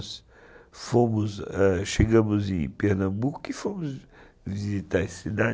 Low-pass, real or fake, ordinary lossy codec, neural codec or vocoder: none; real; none; none